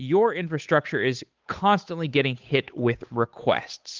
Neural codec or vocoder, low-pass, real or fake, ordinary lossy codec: codec, 16 kHz, 8 kbps, FunCodec, trained on Chinese and English, 25 frames a second; 7.2 kHz; fake; Opus, 32 kbps